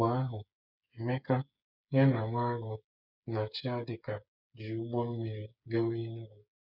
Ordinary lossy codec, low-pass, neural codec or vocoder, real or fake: none; 5.4 kHz; codec, 16 kHz, 8 kbps, FreqCodec, smaller model; fake